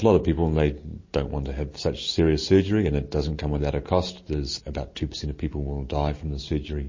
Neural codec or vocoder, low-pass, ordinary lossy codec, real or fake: none; 7.2 kHz; MP3, 32 kbps; real